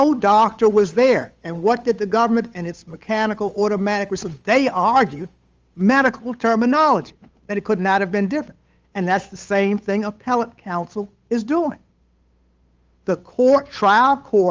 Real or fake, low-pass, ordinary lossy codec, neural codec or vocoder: fake; 7.2 kHz; Opus, 32 kbps; codec, 16 kHz, 8 kbps, FunCodec, trained on Chinese and English, 25 frames a second